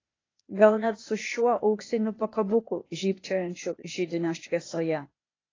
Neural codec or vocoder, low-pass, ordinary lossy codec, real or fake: codec, 16 kHz, 0.8 kbps, ZipCodec; 7.2 kHz; AAC, 32 kbps; fake